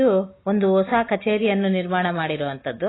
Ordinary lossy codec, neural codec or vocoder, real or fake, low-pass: AAC, 16 kbps; none; real; 7.2 kHz